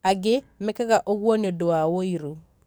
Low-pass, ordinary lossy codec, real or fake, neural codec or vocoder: none; none; fake; codec, 44.1 kHz, 7.8 kbps, DAC